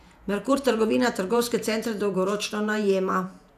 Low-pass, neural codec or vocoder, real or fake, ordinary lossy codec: 14.4 kHz; vocoder, 48 kHz, 128 mel bands, Vocos; fake; none